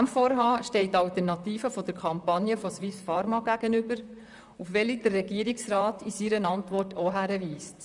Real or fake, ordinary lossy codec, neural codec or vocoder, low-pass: fake; none; vocoder, 44.1 kHz, 128 mel bands, Pupu-Vocoder; 10.8 kHz